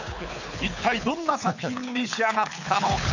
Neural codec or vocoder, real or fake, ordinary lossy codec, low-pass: codec, 24 kHz, 6 kbps, HILCodec; fake; none; 7.2 kHz